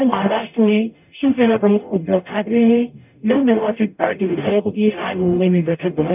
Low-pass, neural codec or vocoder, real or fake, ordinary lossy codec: 3.6 kHz; codec, 44.1 kHz, 0.9 kbps, DAC; fake; none